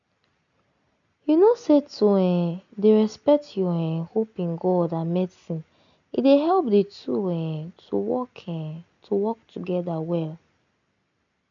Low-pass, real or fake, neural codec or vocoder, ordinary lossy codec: 7.2 kHz; real; none; none